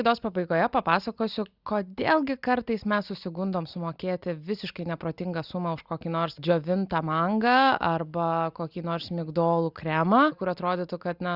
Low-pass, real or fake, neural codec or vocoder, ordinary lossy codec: 5.4 kHz; real; none; Opus, 64 kbps